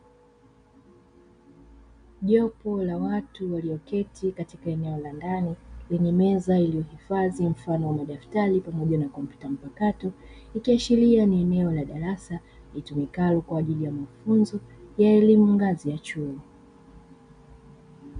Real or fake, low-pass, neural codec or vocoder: real; 9.9 kHz; none